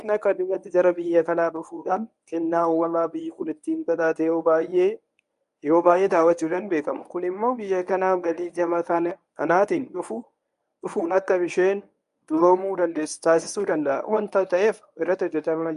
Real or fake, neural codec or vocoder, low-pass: fake; codec, 24 kHz, 0.9 kbps, WavTokenizer, medium speech release version 1; 10.8 kHz